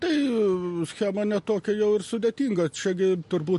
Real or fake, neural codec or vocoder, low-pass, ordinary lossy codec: real; none; 14.4 kHz; MP3, 48 kbps